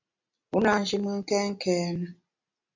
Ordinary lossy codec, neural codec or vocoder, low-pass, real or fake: MP3, 48 kbps; none; 7.2 kHz; real